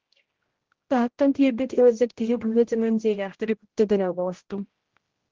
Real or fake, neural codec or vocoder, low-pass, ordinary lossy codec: fake; codec, 16 kHz, 0.5 kbps, X-Codec, HuBERT features, trained on general audio; 7.2 kHz; Opus, 16 kbps